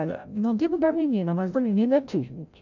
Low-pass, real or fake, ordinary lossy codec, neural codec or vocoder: 7.2 kHz; fake; none; codec, 16 kHz, 0.5 kbps, FreqCodec, larger model